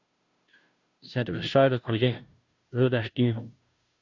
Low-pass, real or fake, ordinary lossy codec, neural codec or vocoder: 7.2 kHz; fake; Opus, 64 kbps; codec, 16 kHz, 0.5 kbps, FunCodec, trained on Chinese and English, 25 frames a second